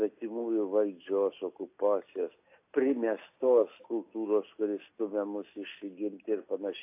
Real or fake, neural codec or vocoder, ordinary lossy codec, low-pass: real; none; AAC, 24 kbps; 3.6 kHz